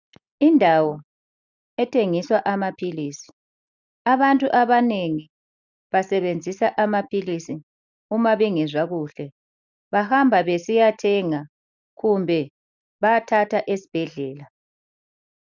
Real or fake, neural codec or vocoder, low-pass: real; none; 7.2 kHz